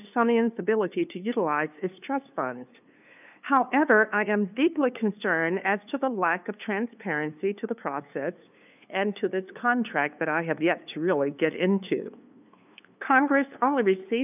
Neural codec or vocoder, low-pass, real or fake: codec, 16 kHz, 2 kbps, FunCodec, trained on LibriTTS, 25 frames a second; 3.6 kHz; fake